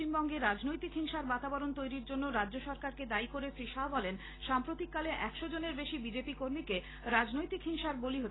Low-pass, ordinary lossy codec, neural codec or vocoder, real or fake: 7.2 kHz; AAC, 16 kbps; none; real